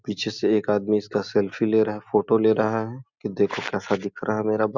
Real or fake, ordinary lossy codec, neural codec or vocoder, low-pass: real; Opus, 64 kbps; none; 7.2 kHz